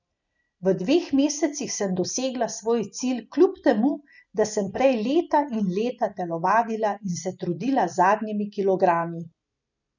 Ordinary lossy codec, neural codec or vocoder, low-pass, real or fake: none; none; 7.2 kHz; real